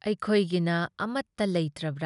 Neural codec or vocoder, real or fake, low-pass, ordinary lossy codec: none; real; 10.8 kHz; none